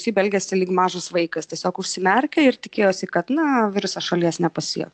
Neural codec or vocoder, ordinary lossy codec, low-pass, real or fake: none; AAC, 64 kbps; 9.9 kHz; real